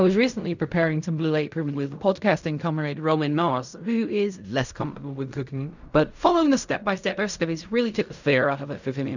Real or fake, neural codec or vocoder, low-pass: fake; codec, 16 kHz in and 24 kHz out, 0.4 kbps, LongCat-Audio-Codec, fine tuned four codebook decoder; 7.2 kHz